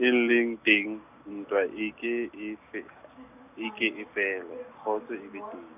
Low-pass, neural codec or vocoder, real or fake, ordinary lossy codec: 3.6 kHz; none; real; none